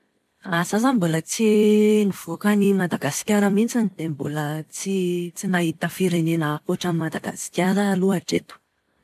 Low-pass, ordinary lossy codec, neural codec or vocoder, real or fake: 14.4 kHz; none; vocoder, 44.1 kHz, 128 mel bands every 256 samples, BigVGAN v2; fake